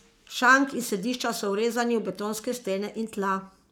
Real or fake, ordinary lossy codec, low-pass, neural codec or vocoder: fake; none; none; codec, 44.1 kHz, 7.8 kbps, Pupu-Codec